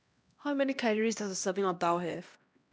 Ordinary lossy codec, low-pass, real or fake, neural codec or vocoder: none; none; fake; codec, 16 kHz, 1 kbps, X-Codec, HuBERT features, trained on LibriSpeech